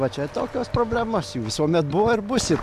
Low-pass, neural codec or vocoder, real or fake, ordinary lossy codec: 14.4 kHz; none; real; MP3, 96 kbps